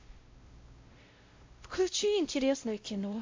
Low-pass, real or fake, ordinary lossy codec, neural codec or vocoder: 7.2 kHz; fake; MP3, 48 kbps; codec, 16 kHz, 0.5 kbps, X-Codec, WavLM features, trained on Multilingual LibriSpeech